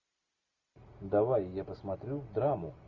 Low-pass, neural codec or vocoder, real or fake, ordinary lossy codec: 7.2 kHz; none; real; Opus, 64 kbps